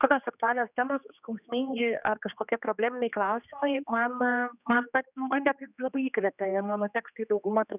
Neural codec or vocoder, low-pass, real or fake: codec, 16 kHz, 2 kbps, X-Codec, HuBERT features, trained on general audio; 3.6 kHz; fake